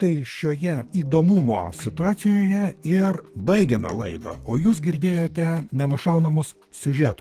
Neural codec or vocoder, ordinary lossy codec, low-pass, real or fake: codec, 32 kHz, 1.9 kbps, SNAC; Opus, 24 kbps; 14.4 kHz; fake